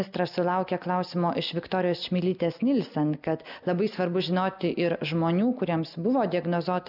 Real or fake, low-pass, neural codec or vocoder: real; 5.4 kHz; none